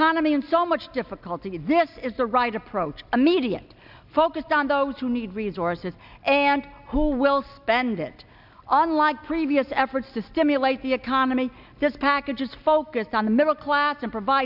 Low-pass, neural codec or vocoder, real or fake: 5.4 kHz; none; real